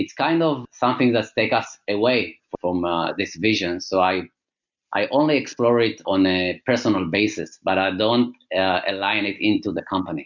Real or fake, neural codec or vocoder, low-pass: real; none; 7.2 kHz